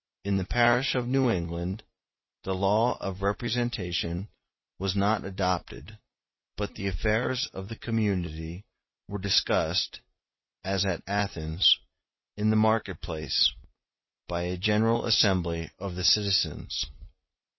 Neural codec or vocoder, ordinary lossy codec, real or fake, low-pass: none; MP3, 24 kbps; real; 7.2 kHz